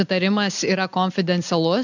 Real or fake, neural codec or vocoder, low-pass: real; none; 7.2 kHz